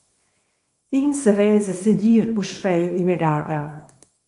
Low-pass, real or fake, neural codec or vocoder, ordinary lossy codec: 10.8 kHz; fake; codec, 24 kHz, 0.9 kbps, WavTokenizer, small release; AAC, 64 kbps